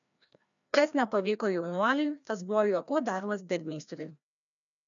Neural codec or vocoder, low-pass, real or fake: codec, 16 kHz, 1 kbps, FreqCodec, larger model; 7.2 kHz; fake